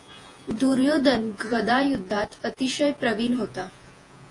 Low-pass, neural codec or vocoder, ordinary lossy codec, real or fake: 10.8 kHz; vocoder, 48 kHz, 128 mel bands, Vocos; AAC, 48 kbps; fake